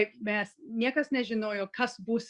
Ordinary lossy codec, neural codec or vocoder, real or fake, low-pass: Opus, 64 kbps; none; real; 10.8 kHz